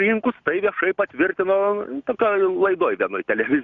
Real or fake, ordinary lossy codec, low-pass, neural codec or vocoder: real; Opus, 64 kbps; 7.2 kHz; none